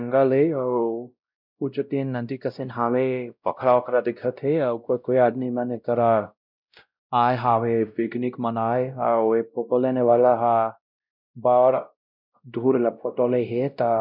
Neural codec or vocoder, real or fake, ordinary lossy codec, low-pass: codec, 16 kHz, 0.5 kbps, X-Codec, WavLM features, trained on Multilingual LibriSpeech; fake; none; 5.4 kHz